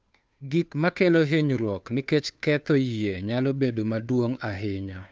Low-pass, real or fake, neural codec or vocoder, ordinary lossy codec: none; fake; codec, 16 kHz, 2 kbps, FunCodec, trained on Chinese and English, 25 frames a second; none